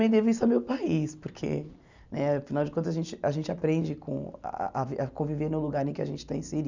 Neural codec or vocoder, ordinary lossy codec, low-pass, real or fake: none; none; 7.2 kHz; real